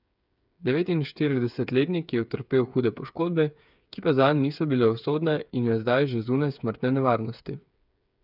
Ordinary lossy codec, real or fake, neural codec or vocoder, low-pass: none; fake; codec, 16 kHz, 8 kbps, FreqCodec, smaller model; 5.4 kHz